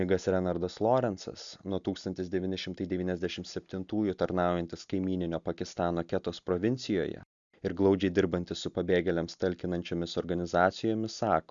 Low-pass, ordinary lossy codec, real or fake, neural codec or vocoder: 7.2 kHz; Opus, 64 kbps; real; none